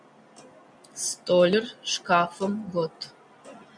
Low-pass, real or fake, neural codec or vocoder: 9.9 kHz; real; none